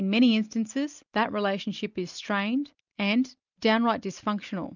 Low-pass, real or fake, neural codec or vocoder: 7.2 kHz; real; none